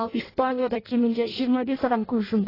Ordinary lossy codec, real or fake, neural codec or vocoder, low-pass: AAC, 24 kbps; fake; codec, 16 kHz in and 24 kHz out, 0.6 kbps, FireRedTTS-2 codec; 5.4 kHz